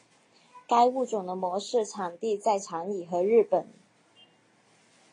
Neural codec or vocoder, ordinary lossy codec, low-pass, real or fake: none; AAC, 32 kbps; 9.9 kHz; real